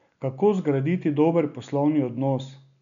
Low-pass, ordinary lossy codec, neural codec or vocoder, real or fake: 7.2 kHz; none; none; real